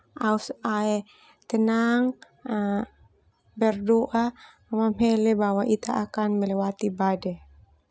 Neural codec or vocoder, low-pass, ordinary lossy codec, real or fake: none; none; none; real